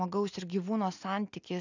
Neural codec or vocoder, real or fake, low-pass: none; real; 7.2 kHz